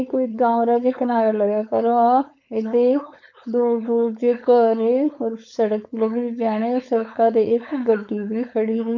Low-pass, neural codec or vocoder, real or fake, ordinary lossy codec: 7.2 kHz; codec, 16 kHz, 4.8 kbps, FACodec; fake; none